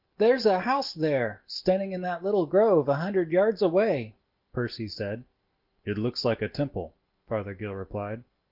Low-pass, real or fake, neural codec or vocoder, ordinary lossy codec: 5.4 kHz; real; none; Opus, 32 kbps